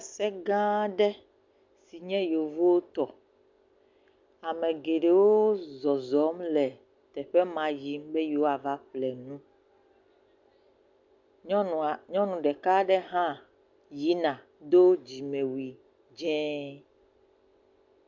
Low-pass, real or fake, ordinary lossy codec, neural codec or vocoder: 7.2 kHz; real; MP3, 64 kbps; none